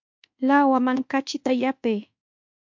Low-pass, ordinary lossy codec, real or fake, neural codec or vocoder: 7.2 kHz; MP3, 48 kbps; fake; codec, 24 kHz, 1.2 kbps, DualCodec